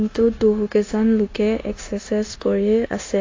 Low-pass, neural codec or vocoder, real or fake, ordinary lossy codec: 7.2 kHz; codec, 16 kHz, 0.9 kbps, LongCat-Audio-Codec; fake; AAC, 32 kbps